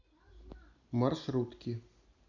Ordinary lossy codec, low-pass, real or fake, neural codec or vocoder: none; 7.2 kHz; real; none